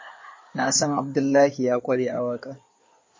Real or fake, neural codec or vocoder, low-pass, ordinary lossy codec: fake; codec, 16 kHz in and 24 kHz out, 2.2 kbps, FireRedTTS-2 codec; 7.2 kHz; MP3, 32 kbps